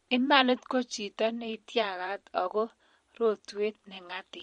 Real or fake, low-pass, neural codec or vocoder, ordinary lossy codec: fake; 19.8 kHz; vocoder, 44.1 kHz, 128 mel bands every 512 samples, BigVGAN v2; MP3, 48 kbps